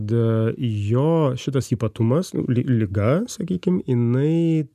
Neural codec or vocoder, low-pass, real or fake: none; 14.4 kHz; real